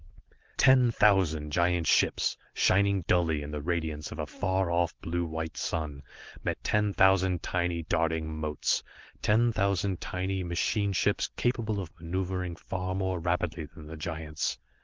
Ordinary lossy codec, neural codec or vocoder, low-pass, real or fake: Opus, 16 kbps; none; 7.2 kHz; real